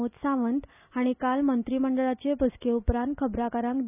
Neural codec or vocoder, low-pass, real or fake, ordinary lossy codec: none; 3.6 kHz; real; none